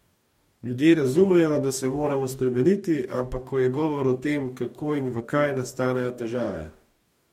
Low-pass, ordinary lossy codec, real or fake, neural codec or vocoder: 19.8 kHz; MP3, 64 kbps; fake; codec, 44.1 kHz, 2.6 kbps, DAC